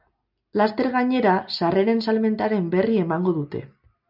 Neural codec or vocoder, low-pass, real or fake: none; 5.4 kHz; real